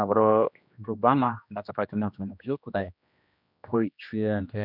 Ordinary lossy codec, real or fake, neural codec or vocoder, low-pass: none; fake; codec, 16 kHz, 1 kbps, X-Codec, HuBERT features, trained on general audio; 5.4 kHz